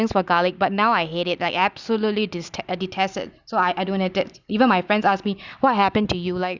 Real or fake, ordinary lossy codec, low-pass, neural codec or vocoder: real; Opus, 64 kbps; 7.2 kHz; none